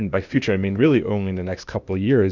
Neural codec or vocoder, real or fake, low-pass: codec, 16 kHz, 0.8 kbps, ZipCodec; fake; 7.2 kHz